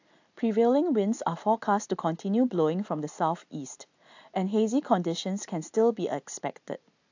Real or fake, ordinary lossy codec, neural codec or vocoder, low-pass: real; AAC, 48 kbps; none; 7.2 kHz